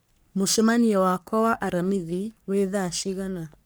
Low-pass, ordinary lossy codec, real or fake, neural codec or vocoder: none; none; fake; codec, 44.1 kHz, 3.4 kbps, Pupu-Codec